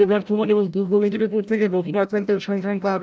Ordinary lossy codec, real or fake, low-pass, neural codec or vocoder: none; fake; none; codec, 16 kHz, 0.5 kbps, FreqCodec, larger model